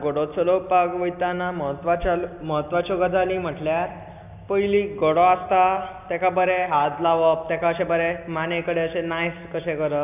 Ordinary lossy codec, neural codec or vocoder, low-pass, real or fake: none; none; 3.6 kHz; real